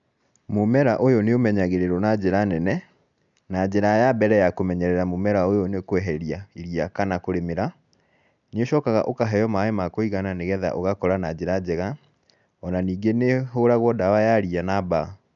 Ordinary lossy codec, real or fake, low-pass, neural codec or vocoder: none; real; 7.2 kHz; none